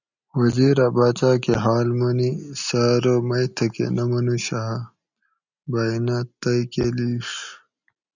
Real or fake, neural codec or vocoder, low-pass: real; none; 7.2 kHz